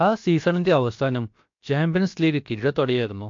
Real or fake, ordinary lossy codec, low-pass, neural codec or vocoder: fake; MP3, 48 kbps; 7.2 kHz; codec, 16 kHz, about 1 kbps, DyCAST, with the encoder's durations